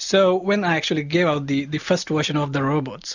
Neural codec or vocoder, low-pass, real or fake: none; 7.2 kHz; real